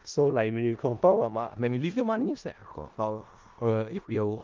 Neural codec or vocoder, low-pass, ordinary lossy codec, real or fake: codec, 16 kHz in and 24 kHz out, 0.4 kbps, LongCat-Audio-Codec, four codebook decoder; 7.2 kHz; Opus, 32 kbps; fake